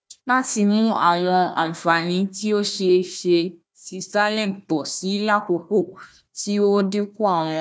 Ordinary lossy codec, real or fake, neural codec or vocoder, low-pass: none; fake; codec, 16 kHz, 1 kbps, FunCodec, trained on Chinese and English, 50 frames a second; none